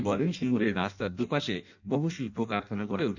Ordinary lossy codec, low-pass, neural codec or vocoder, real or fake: none; 7.2 kHz; codec, 16 kHz in and 24 kHz out, 0.6 kbps, FireRedTTS-2 codec; fake